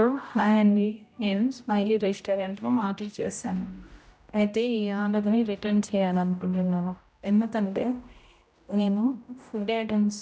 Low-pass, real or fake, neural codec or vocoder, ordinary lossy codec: none; fake; codec, 16 kHz, 0.5 kbps, X-Codec, HuBERT features, trained on general audio; none